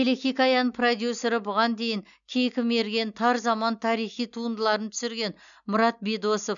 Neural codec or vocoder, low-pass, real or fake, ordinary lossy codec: none; 7.2 kHz; real; none